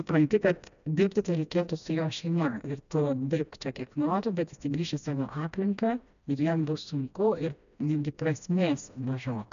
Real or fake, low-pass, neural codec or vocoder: fake; 7.2 kHz; codec, 16 kHz, 1 kbps, FreqCodec, smaller model